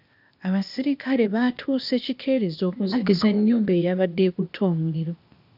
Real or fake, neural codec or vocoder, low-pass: fake; codec, 16 kHz, 0.8 kbps, ZipCodec; 5.4 kHz